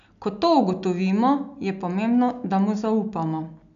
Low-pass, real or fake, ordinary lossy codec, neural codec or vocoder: 7.2 kHz; real; none; none